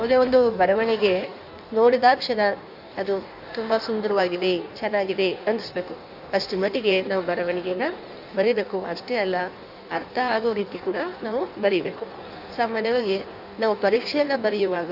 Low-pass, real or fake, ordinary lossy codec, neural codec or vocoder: 5.4 kHz; fake; none; codec, 16 kHz in and 24 kHz out, 1.1 kbps, FireRedTTS-2 codec